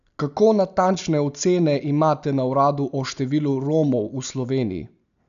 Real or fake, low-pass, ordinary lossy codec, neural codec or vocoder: real; 7.2 kHz; none; none